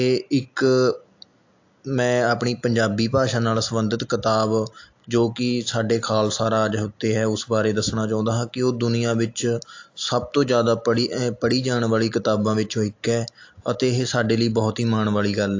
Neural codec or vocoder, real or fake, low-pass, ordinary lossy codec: none; real; 7.2 kHz; AAC, 48 kbps